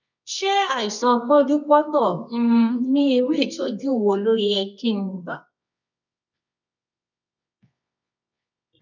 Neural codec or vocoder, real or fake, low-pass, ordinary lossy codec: codec, 24 kHz, 0.9 kbps, WavTokenizer, medium music audio release; fake; 7.2 kHz; none